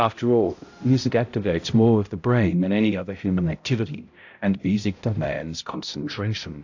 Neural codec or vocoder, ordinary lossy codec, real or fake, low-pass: codec, 16 kHz, 0.5 kbps, X-Codec, HuBERT features, trained on balanced general audio; AAC, 48 kbps; fake; 7.2 kHz